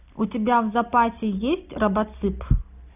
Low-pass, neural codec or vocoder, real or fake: 3.6 kHz; none; real